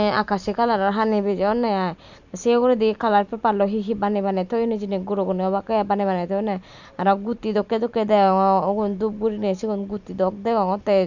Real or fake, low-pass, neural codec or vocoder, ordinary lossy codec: fake; 7.2 kHz; vocoder, 44.1 kHz, 128 mel bands every 256 samples, BigVGAN v2; none